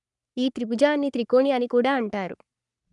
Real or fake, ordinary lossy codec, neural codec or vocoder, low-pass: fake; none; codec, 44.1 kHz, 3.4 kbps, Pupu-Codec; 10.8 kHz